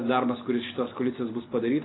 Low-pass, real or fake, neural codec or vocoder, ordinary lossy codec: 7.2 kHz; real; none; AAC, 16 kbps